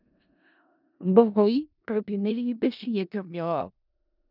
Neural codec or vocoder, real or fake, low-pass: codec, 16 kHz in and 24 kHz out, 0.4 kbps, LongCat-Audio-Codec, four codebook decoder; fake; 5.4 kHz